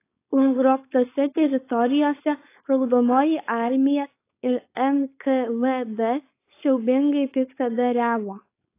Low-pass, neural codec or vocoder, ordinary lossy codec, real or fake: 3.6 kHz; codec, 16 kHz, 4.8 kbps, FACodec; AAC, 24 kbps; fake